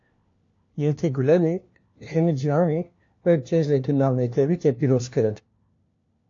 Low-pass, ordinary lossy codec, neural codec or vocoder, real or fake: 7.2 kHz; AAC, 48 kbps; codec, 16 kHz, 1 kbps, FunCodec, trained on LibriTTS, 50 frames a second; fake